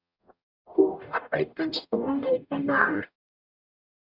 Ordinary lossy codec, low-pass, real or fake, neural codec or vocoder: Opus, 64 kbps; 5.4 kHz; fake; codec, 44.1 kHz, 0.9 kbps, DAC